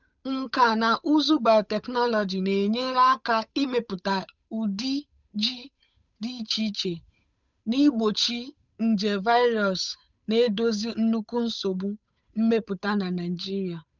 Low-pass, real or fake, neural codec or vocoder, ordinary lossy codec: 7.2 kHz; fake; codec, 16 kHz, 8 kbps, FunCodec, trained on Chinese and English, 25 frames a second; none